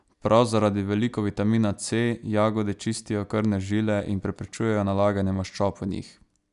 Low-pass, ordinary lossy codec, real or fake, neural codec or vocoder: 10.8 kHz; none; real; none